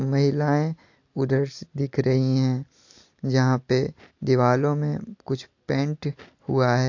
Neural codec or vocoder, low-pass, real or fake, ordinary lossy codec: none; 7.2 kHz; real; AAC, 48 kbps